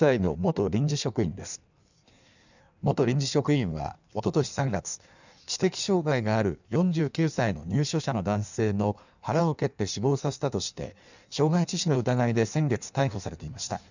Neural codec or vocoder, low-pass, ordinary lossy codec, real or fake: codec, 16 kHz in and 24 kHz out, 1.1 kbps, FireRedTTS-2 codec; 7.2 kHz; none; fake